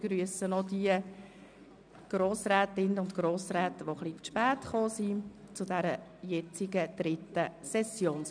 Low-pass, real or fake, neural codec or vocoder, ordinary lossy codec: 9.9 kHz; real; none; none